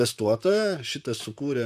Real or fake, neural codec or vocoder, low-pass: fake; vocoder, 44.1 kHz, 128 mel bands, Pupu-Vocoder; 14.4 kHz